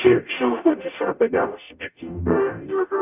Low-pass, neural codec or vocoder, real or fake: 3.6 kHz; codec, 44.1 kHz, 0.9 kbps, DAC; fake